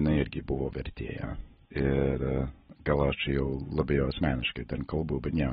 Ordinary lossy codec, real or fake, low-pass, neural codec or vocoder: AAC, 16 kbps; real; 19.8 kHz; none